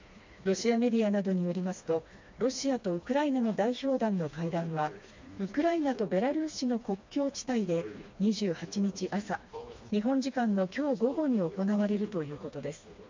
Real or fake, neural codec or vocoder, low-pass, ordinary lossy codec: fake; codec, 16 kHz, 2 kbps, FreqCodec, smaller model; 7.2 kHz; MP3, 48 kbps